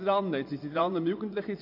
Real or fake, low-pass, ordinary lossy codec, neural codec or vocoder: fake; 5.4 kHz; AAC, 48 kbps; vocoder, 24 kHz, 100 mel bands, Vocos